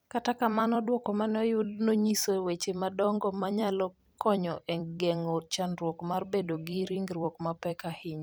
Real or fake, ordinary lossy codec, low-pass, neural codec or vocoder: fake; none; none; vocoder, 44.1 kHz, 128 mel bands every 256 samples, BigVGAN v2